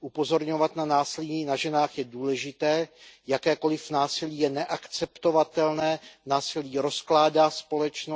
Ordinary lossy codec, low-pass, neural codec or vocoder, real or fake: none; none; none; real